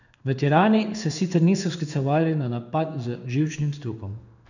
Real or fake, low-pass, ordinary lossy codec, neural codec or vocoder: fake; 7.2 kHz; none; codec, 16 kHz in and 24 kHz out, 1 kbps, XY-Tokenizer